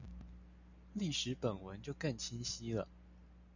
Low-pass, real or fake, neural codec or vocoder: 7.2 kHz; real; none